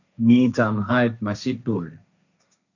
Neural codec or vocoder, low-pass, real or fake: codec, 16 kHz, 1.1 kbps, Voila-Tokenizer; 7.2 kHz; fake